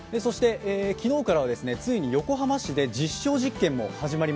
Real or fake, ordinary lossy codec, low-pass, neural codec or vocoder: real; none; none; none